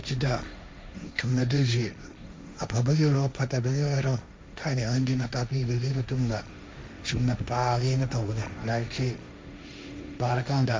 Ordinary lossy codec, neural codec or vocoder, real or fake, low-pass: none; codec, 16 kHz, 1.1 kbps, Voila-Tokenizer; fake; none